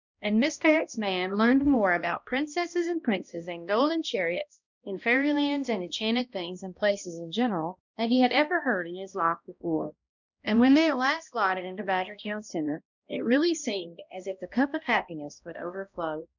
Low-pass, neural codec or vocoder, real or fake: 7.2 kHz; codec, 16 kHz, 1 kbps, X-Codec, HuBERT features, trained on balanced general audio; fake